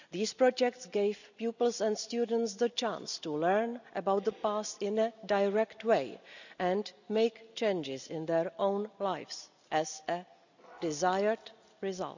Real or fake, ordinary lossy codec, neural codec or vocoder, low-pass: real; none; none; 7.2 kHz